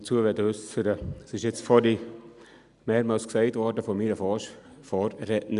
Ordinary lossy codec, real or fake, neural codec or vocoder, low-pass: none; real; none; 10.8 kHz